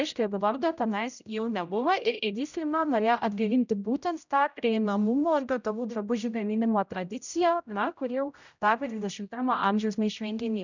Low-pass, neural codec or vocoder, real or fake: 7.2 kHz; codec, 16 kHz, 0.5 kbps, X-Codec, HuBERT features, trained on general audio; fake